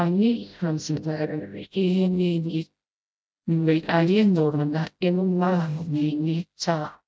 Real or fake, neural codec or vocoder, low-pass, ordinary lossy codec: fake; codec, 16 kHz, 0.5 kbps, FreqCodec, smaller model; none; none